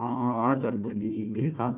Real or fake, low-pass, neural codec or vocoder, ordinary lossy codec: fake; 3.6 kHz; codec, 16 kHz, 1 kbps, FunCodec, trained on Chinese and English, 50 frames a second; none